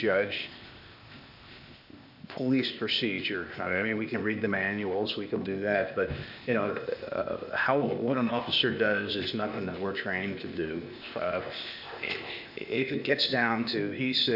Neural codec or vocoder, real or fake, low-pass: codec, 16 kHz, 0.8 kbps, ZipCodec; fake; 5.4 kHz